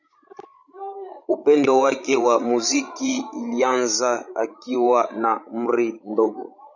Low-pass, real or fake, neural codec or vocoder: 7.2 kHz; fake; codec, 16 kHz, 16 kbps, FreqCodec, larger model